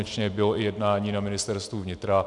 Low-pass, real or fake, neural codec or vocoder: 10.8 kHz; real; none